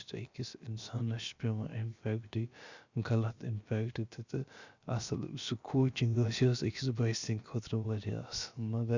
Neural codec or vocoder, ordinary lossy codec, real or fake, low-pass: codec, 16 kHz, about 1 kbps, DyCAST, with the encoder's durations; Opus, 64 kbps; fake; 7.2 kHz